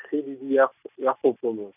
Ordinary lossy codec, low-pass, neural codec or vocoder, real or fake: none; 3.6 kHz; none; real